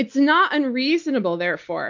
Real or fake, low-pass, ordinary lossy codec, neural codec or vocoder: real; 7.2 kHz; MP3, 48 kbps; none